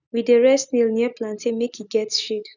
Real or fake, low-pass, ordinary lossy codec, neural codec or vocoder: real; 7.2 kHz; none; none